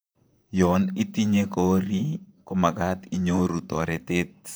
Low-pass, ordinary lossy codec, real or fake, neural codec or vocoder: none; none; fake; vocoder, 44.1 kHz, 128 mel bands, Pupu-Vocoder